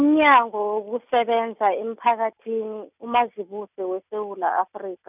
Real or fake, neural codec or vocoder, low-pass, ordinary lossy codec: real; none; 3.6 kHz; none